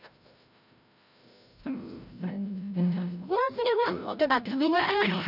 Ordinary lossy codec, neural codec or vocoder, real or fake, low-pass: none; codec, 16 kHz, 0.5 kbps, FreqCodec, larger model; fake; 5.4 kHz